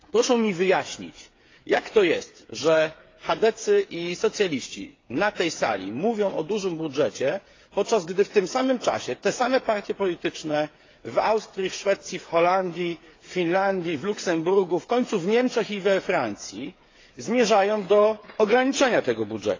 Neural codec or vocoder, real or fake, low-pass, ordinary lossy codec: codec, 16 kHz, 8 kbps, FreqCodec, smaller model; fake; 7.2 kHz; AAC, 32 kbps